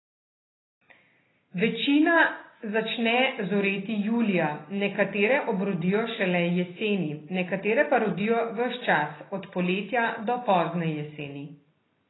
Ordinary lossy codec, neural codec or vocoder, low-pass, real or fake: AAC, 16 kbps; none; 7.2 kHz; real